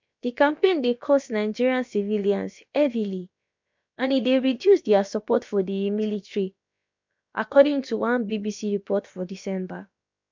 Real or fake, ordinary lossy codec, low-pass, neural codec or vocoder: fake; MP3, 64 kbps; 7.2 kHz; codec, 16 kHz, about 1 kbps, DyCAST, with the encoder's durations